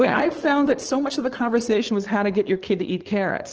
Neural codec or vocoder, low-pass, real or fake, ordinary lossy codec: codec, 16 kHz, 4.8 kbps, FACodec; 7.2 kHz; fake; Opus, 16 kbps